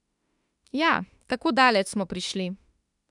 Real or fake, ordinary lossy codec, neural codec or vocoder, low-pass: fake; none; autoencoder, 48 kHz, 32 numbers a frame, DAC-VAE, trained on Japanese speech; 10.8 kHz